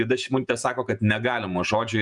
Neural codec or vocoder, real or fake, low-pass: vocoder, 48 kHz, 128 mel bands, Vocos; fake; 10.8 kHz